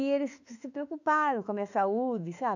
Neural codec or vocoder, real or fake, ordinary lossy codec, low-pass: autoencoder, 48 kHz, 32 numbers a frame, DAC-VAE, trained on Japanese speech; fake; none; 7.2 kHz